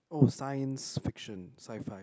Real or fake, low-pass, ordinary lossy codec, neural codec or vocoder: real; none; none; none